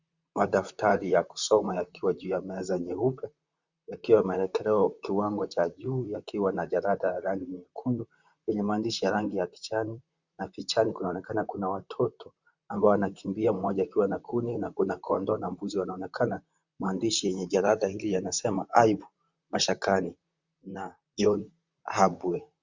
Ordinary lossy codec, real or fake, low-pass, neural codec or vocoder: Opus, 64 kbps; fake; 7.2 kHz; vocoder, 44.1 kHz, 128 mel bands, Pupu-Vocoder